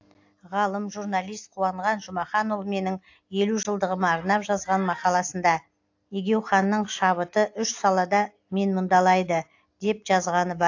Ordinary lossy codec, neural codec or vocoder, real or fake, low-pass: AAC, 48 kbps; none; real; 7.2 kHz